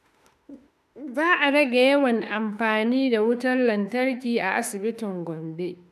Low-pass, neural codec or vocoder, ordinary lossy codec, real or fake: 14.4 kHz; autoencoder, 48 kHz, 32 numbers a frame, DAC-VAE, trained on Japanese speech; none; fake